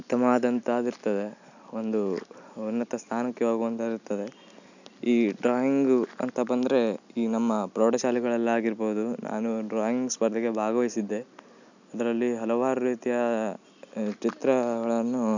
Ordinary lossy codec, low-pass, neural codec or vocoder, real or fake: none; 7.2 kHz; none; real